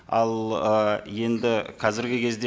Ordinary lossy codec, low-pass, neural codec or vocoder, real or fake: none; none; none; real